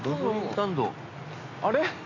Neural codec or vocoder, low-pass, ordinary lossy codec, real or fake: none; 7.2 kHz; AAC, 32 kbps; real